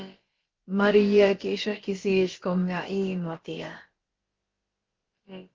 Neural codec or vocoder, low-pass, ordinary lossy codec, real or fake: codec, 16 kHz, about 1 kbps, DyCAST, with the encoder's durations; 7.2 kHz; Opus, 16 kbps; fake